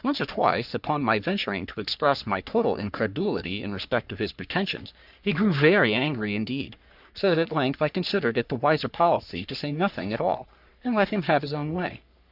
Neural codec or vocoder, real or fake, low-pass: codec, 44.1 kHz, 3.4 kbps, Pupu-Codec; fake; 5.4 kHz